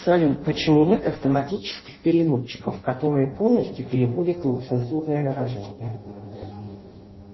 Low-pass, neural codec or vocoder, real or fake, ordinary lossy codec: 7.2 kHz; codec, 16 kHz in and 24 kHz out, 0.6 kbps, FireRedTTS-2 codec; fake; MP3, 24 kbps